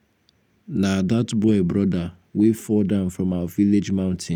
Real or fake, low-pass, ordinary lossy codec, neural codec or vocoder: real; 19.8 kHz; none; none